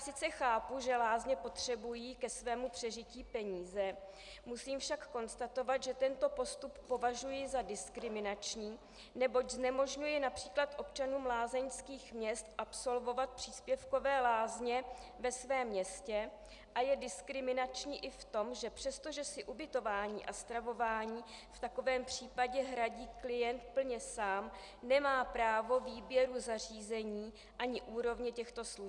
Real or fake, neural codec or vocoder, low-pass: real; none; 10.8 kHz